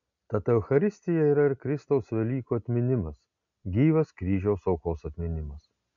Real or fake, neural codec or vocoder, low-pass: real; none; 7.2 kHz